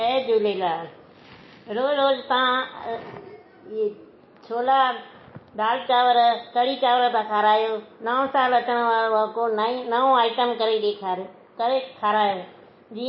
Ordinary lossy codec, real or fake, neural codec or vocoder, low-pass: MP3, 24 kbps; real; none; 7.2 kHz